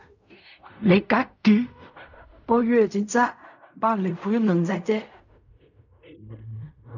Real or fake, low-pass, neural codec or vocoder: fake; 7.2 kHz; codec, 16 kHz in and 24 kHz out, 0.4 kbps, LongCat-Audio-Codec, fine tuned four codebook decoder